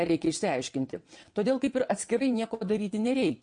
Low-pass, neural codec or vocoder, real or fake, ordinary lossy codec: 9.9 kHz; vocoder, 22.05 kHz, 80 mel bands, WaveNeXt; fake; MP3, 48 kbps